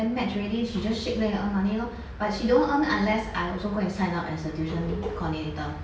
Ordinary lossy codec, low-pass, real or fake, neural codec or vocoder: none; none; real; none